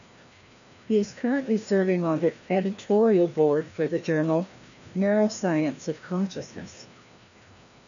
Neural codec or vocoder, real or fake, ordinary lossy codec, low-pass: codec, 16 kHz, 1 kbps, FreqCodec, larger model; fake; MP3, 96 kbps; 7.2 kHz